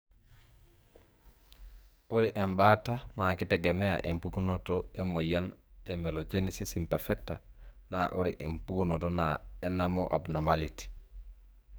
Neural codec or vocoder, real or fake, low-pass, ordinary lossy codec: codec, 44.1 kHz, 2.6 kbps, SNAC; fake; none; none